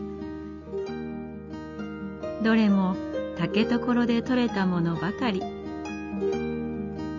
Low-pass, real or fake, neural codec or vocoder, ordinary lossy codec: 7.2 kHz; real; none; none